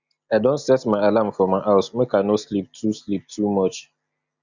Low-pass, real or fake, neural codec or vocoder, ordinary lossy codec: 7.2 kHz; real; none; Opus, 64 kbps